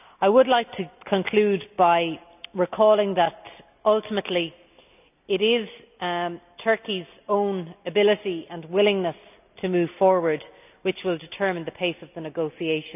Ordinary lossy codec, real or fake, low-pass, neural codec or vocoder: none; real; 3.6 kHz; none